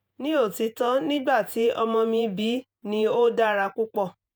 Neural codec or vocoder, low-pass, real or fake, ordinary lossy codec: vocoder, 48 kHz, 128 mel bands, Vocos; none; fake; none